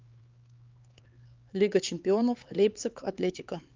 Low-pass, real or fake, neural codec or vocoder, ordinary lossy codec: 7.2 kHz; fake; codec, 16 kHz, 4 kbps, X-Codec, HuBERT features, trained on LibriSpeech; Opus, 24 kbps